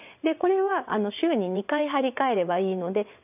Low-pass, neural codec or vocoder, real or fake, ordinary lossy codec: 3.6 kHz; vocoder, 22.05 kHz, 80 mel bands, WaveNeXt; fake; none